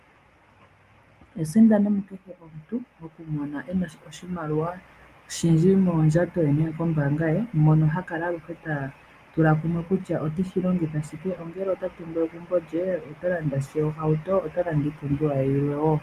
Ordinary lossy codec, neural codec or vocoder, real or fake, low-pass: Opus, 32 kbps; none; real; 14.4 kHz